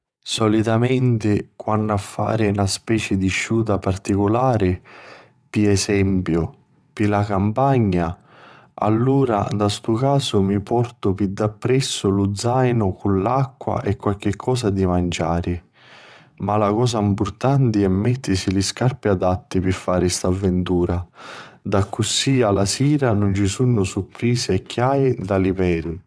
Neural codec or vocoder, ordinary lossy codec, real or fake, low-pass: vocoder, 22.05 kHz, 80 mel bands, WaveNeXt; none; fake; none